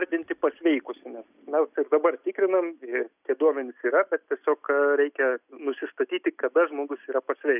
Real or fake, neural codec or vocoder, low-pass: real; none; 3.6 kHz